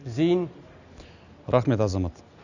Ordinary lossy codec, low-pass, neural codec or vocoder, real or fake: none; 7.2 kHz; none; real